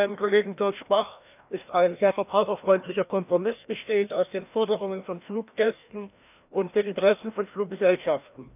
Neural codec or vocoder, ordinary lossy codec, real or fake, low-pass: codec, 16 kHz, 1 kbps, FreqCodec, larger model; none; fake; 3.6 kHz